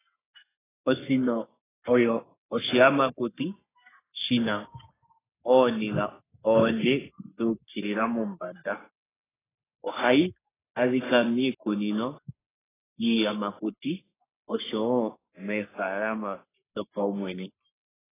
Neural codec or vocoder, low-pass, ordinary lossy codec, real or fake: codec, 44.1 kHz, 3.4 kbps, Pupu-Codec; 3.6 kHz; AAC, 16 kbps; fake